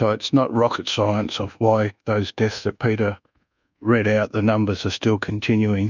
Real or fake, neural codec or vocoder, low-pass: fake; codec, 24 kHz, 1.2 kbps, DualCodec; 7.2 kHz